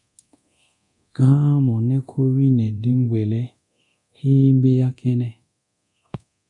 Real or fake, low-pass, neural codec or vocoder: fake; 10.8 kHz; codec, 24 kHz, 0.9 kbps, DualCodec